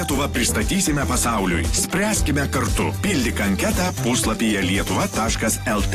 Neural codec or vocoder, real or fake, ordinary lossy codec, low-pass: none; real; AAC, 48 kbps; 14.4 kHz